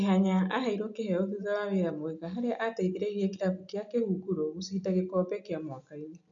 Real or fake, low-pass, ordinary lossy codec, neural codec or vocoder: real; 7.2 kHz; none; none